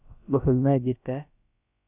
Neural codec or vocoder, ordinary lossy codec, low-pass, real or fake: codec, 16 kHz, about 1 kbps, DyCAST, with the encoder's durations; none; 3.6 kHz; fake